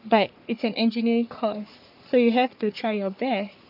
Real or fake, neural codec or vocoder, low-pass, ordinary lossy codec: fake; codec, 44.1 kHz, 3.4 kbps, Pupu-Codec; 5.4 kHz; none